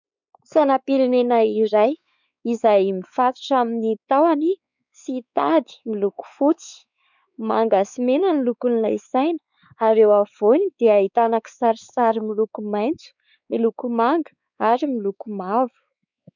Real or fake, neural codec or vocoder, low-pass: fake; codec, 16 kHz, 4 kbps, X-Codec, WavLM features, trained on Multilingual LibriSpeech; 7.2 kHz